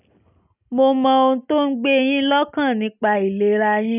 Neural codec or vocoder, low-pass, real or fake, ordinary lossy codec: none; 3.6 kHz; real; none